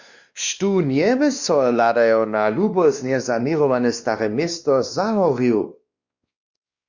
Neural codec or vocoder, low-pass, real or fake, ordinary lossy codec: codec, 16 kHz, 2 kbps, X-Codec, WavLM features, trained on Multilingual LibriSpeech; 7.2 kHz; fake; Opus, 64 kbps